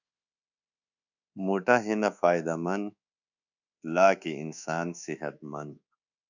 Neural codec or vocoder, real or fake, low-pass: codec, 24 kHz, 1.2 kbps, DualCodec; fake; 7.2 kHz